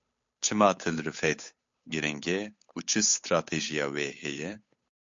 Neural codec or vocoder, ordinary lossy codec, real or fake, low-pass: codec, 16 kHz, 8 kbps, FunCodec, trained on Chinese and English, 25 frames a second; MP3, 48 kbps; fake; 7.2 kHz